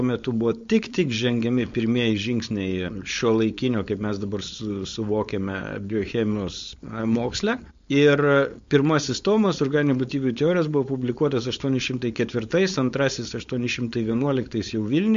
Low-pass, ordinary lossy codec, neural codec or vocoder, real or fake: 7.2 kHz; MP3, 48 kbps; codec, 16 kHz, 4.8 kbps, FACodec; fake